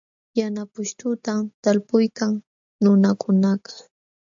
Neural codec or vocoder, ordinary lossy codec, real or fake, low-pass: none; AAC, 64 kbps; real; 7.2 kHz